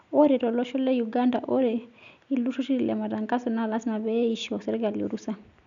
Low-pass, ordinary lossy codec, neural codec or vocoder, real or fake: 7.2 kHz; none; none; real